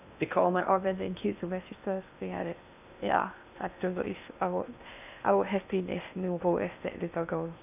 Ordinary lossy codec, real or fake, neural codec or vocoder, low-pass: none; fake; codec, 16 kHz in and 24 kHz out, 0.6 kbps, FocalCodec, streaming, 2048 codes; 3.6 kHz